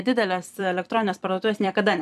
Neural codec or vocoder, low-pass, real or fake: vocoder, 44.1 kHz, 128 mel bands every 256 samples, BigVGAN v2; 14.4 kHz; fake